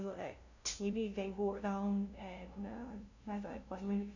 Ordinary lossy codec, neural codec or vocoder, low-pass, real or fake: none; codec, 16 kHz, 0.5 kbps, FunCodec, trained on LibriTTS, 25 frames a second; 7.2 kHz; fake